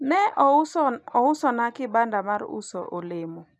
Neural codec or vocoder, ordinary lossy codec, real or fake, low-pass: none; none; real; none